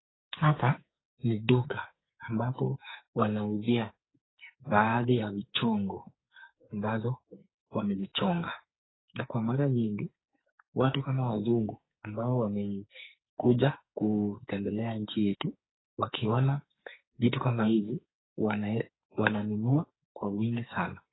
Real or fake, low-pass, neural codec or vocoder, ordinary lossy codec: fake; 7.2 kHz; codec, 44.1 kHz, 2.6 kbps, SNAC; AAC, 16 kbps